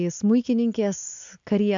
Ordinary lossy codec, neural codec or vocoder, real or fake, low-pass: AAC, 64 kbps; none; real; 7.2 kHz